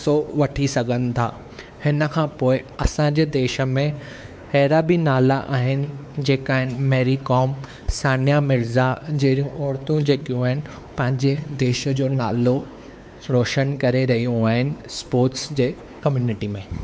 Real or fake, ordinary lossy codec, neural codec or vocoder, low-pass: fake; none; codec, 16 kHz, 4 kbps, X-Codec, WavLM features, trained on Multilingual LibriSpeech; none